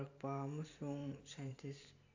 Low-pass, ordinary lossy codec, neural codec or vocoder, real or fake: 7.2 kHz; AAC, 48 kbps; vocoder, 44.1 kHz, 128 mel bands every 512 samples, BigVGAN v2; fake